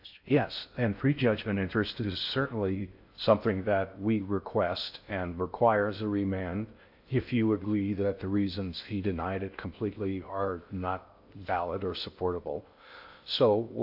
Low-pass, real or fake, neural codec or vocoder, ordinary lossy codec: 5.4 kHz; fake; codec, 16 kHz in and 24 kHz out, 0.6 kbps, FocalCodec, streaming, 4096 codes; AAC, 48 kbps